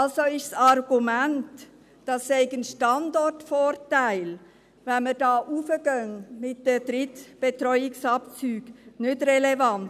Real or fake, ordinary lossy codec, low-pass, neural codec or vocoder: real; none; 14.4 kHz; none